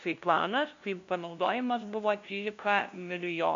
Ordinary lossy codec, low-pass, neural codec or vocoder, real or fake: MP3, 64 kbps; 7.2 kHz; codec, 16 kHz, 0.5 kbps, FunCodec, trained on LibriTTS, 25 frames a second; fake